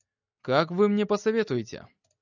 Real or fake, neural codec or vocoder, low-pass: real; none; 7.2 kHz